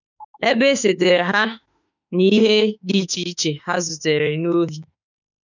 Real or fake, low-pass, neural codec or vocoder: fake; 7.2 kHz; autoencoder, 48 kHz, 32 numbers a frame, DAC-VAE, trained on Japanese speech